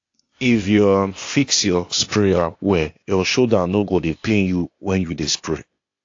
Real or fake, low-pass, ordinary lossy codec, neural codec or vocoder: fake; 7.2 kHz; AAC, 48 kbps; codec, 16 kHz, 0.8 kbps, ZipCodec